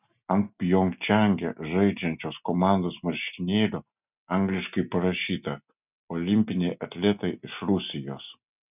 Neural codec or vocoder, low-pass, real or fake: none; 3.6 kHz; real